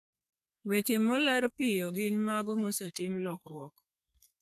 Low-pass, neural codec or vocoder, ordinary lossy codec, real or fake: 14.4 kHz; codec, 32 kHz, 1.9 kbps, SNAC; none; fake